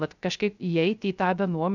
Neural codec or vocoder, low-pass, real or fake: codec, 16 kHz, 0.3 kbps, FocalCodec; 7.2 kHz; fake